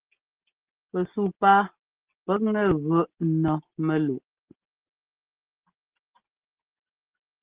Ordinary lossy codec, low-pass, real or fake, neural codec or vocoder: Opus, 16 kbps; 3.6 kHz; real; none